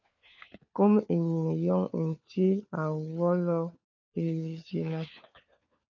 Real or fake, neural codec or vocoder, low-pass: fake; codec, 16 kHz, 4 kbps, FunCodec, trained on LibriTTS, 50 frames a second; 7.2 kHz